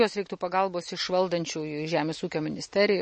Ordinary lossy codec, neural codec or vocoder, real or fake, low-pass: MP3, 32 kbps; none; real; 10.8 kHz